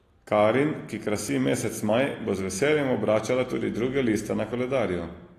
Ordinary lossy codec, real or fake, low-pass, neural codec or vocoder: AAC, 48 kbps; real; 14.4 kHz; none